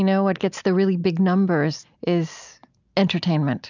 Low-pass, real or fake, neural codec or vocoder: 7.2 kHz; real; none